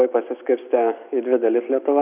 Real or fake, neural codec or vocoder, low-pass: real; none; 3.6 kHz